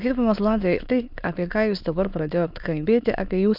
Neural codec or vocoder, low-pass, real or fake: autoencoder, 22.05 kHz, a latent of 192 numbers a frame, VITS, trained on many speakers; 5.4 kHz; fake